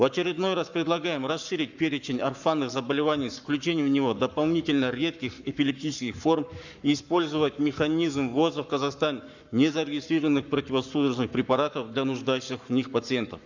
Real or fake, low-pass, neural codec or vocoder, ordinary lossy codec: fake; 7.2 kHz; codec, 44.1 kHz, 7.8 kbps, Pupu-Codec; none